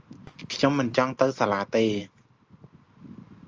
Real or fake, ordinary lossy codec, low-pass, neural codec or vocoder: real; Opus, 24 kbps; 7.2 kHz; none